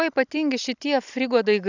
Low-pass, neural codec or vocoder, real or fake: 7.2 kHz; none; real